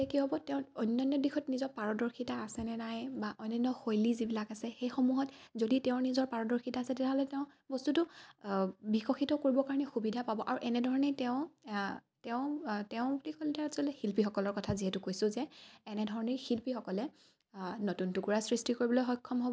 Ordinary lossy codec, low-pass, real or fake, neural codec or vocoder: none; none; real; none